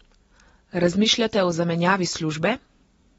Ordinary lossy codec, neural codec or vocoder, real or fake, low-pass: AAC, 24 kbps; none; real; 19.8 kHz